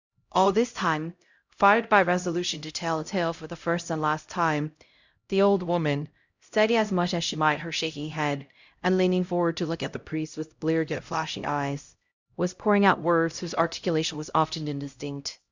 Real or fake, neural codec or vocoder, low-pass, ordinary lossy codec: fake; codec, 16 kHz, 0.5 kbps, X-Codec, HuBERT features, trained on LibriSpeech; 7.2 kHz; Opus, 64 kbps